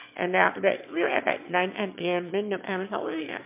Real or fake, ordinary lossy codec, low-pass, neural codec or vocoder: fake; MP3, 32 kbps; 3.6 kHz; autoencoder, 22.05 kHz, a latent of 192 numbers a frame, VITS, trained on one speaker